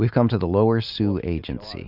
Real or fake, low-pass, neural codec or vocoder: real; 5.4 kHz; none